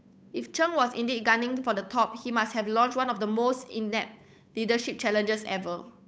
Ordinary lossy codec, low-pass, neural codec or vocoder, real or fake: none; none; codec, 16 kHz, 8 kbps, FunCodec, trained on Chinese and English, 25 frames a second; fake